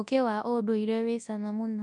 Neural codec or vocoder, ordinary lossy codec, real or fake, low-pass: codec, 24 kHz, 0.9 kbps, WavTokenizer, large speech release; none; fake; 10.8 kHz